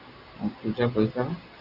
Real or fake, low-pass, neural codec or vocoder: real; 5.4 kHz; none